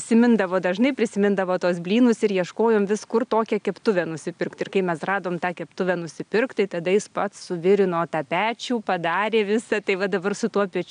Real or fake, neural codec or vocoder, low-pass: real; none; 9.9 kHz